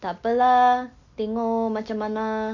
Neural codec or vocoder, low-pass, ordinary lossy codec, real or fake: none; 7.2 kHz; none; real